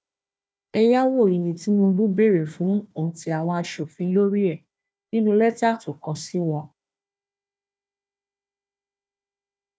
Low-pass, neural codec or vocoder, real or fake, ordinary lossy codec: none; codec, 16 kHz, 1 kbps, FunCodec, trained on Chinese and English, 50 frames a second; fake; none